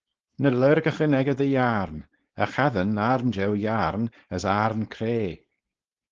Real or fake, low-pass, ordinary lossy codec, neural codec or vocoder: fake; 7.2 kHz; Opus, 16 kbps; codec, 16 kHz, 4.8 kbps, FACodec